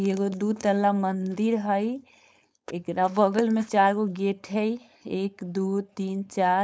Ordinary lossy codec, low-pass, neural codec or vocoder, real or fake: none; none; codec, 16 kHz, 4.8 kbps, FACodec; fake